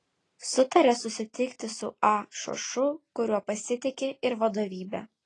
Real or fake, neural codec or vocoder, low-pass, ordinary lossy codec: real; none; 10.8 kHz; AAC, 32 kbps